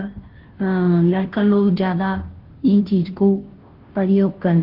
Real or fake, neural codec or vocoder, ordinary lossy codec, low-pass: fake; codec, 16 kHz, 0.5 kbps, FunCodec, trained on Chinese and English, 25 frames a second; Opus, 16 kbps; 5.4 kHz